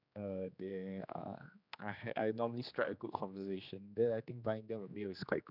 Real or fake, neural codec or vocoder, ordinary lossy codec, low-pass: fake; codec, 16 kHz, 2 kbps, X-Codec, HuBERT features, trained on general audio; none; 5.4 kHz